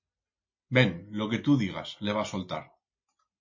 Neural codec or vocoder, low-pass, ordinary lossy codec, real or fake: none; 7.2 kHz; MP3, 32 kbps; real